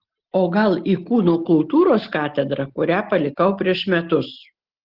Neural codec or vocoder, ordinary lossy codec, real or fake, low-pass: none; Opus, 16 kbps; real; 5.4 kHz